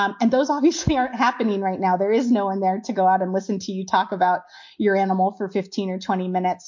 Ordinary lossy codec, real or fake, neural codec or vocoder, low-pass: MP3, 48 kbps; real; none; 7.2 kHz